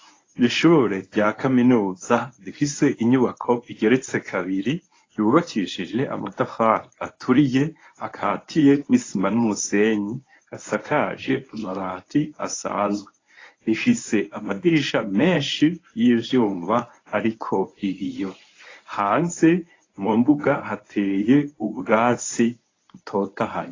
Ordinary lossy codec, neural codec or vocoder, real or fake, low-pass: AAC, 32 kbps; codec, 24 kHz, 0.9 kbps, WavTokenizer, medium speech release version 1; fake; 7.2 kHz